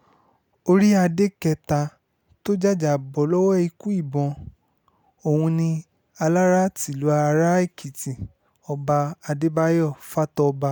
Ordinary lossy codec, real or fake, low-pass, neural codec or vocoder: none; real; none; none